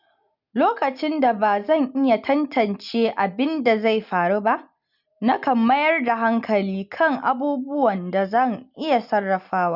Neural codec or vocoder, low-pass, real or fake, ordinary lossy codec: none; 5.4 kHz; real; none